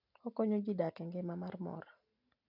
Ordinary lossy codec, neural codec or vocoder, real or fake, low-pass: none; none; real; 5.4 kHz